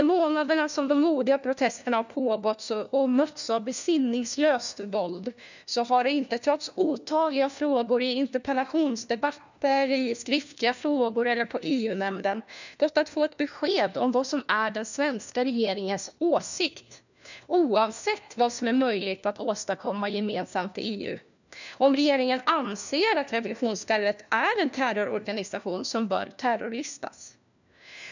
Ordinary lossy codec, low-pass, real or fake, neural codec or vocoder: none; 7.2 kHz; fake; codec, 16 kHz, 1 kbps, FunCodec, trained on LibriTTS, 50 frames a second